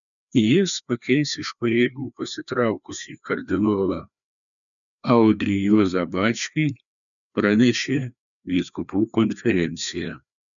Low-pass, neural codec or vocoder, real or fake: 7.2 kHz; codec, 16 kHz, 2 kbps, FreqCodec, larger model; fake